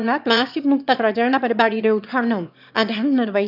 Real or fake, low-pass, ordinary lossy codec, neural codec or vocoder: fake; 5.4 kHz; none; autoencoder, 22.05 kHz, a latent of 192 numbers a frame, VITS, trained on one speaker